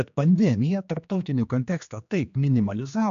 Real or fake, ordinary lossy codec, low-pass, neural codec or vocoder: fake; AAC, 64 kbps; 7.2 kHz; codec, 16 kHz, 2 kbps, X-Codec, HuBERT features, trained on general audio